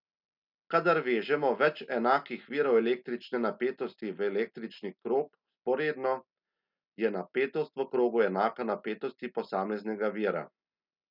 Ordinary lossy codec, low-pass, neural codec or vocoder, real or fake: none; 5.4 kHz; none; real